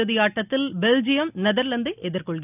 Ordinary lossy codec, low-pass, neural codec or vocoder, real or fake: none; 3.6 kHz; none; real